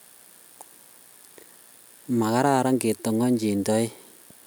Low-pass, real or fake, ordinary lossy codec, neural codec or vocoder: none; real; none; none